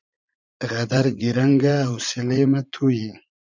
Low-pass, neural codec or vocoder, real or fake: 7.2 kHz; vocoder, 44.1 kHz, 80 mel bands, Vocos; fake